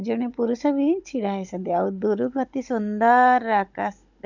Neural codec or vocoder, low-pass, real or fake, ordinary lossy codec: codec, 16 kHz, 16 kbps, FunCodec, trained on Chinese and English, 50 frames a second; 7.2 kHz; fake; none